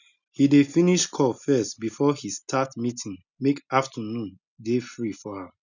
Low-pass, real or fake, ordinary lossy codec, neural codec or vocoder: 7.2 kHz; real; none; none